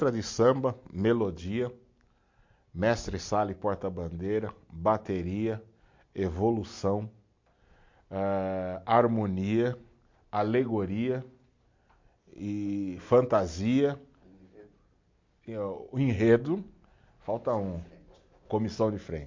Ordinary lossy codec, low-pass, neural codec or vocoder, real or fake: MP3, 48 kbps; 7.2 kHz; none; real